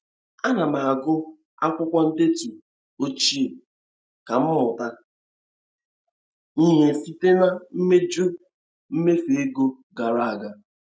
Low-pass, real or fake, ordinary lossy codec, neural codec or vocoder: none; real; none; none